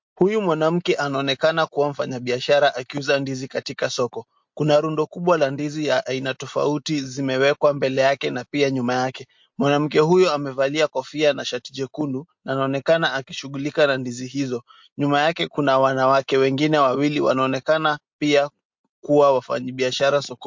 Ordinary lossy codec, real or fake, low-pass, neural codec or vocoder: MP3, 48 kbps; real; 7.2 kHz; none